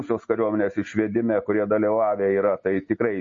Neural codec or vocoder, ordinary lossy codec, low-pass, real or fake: none; MP3, 32 kbps; 7.2 kHz; real